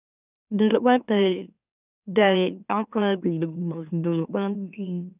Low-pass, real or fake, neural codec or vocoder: 3.6 kHz; fake; autoencoder, 44.1 kHz, a latent of 192 numbers a frame, MeloTTS